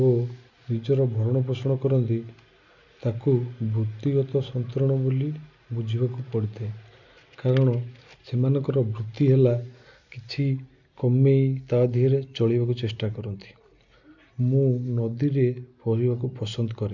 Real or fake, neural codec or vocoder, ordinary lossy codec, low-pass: real; none; none; 7.2 kHz